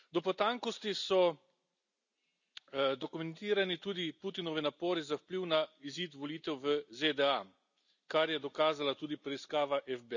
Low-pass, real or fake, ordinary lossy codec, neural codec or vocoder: 7.2 kHz; real; none; none